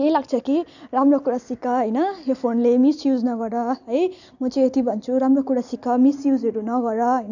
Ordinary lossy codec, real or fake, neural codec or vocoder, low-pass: none; real; none; 7.2 kHz